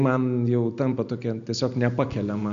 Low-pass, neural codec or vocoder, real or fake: 7.2 kHz; none; real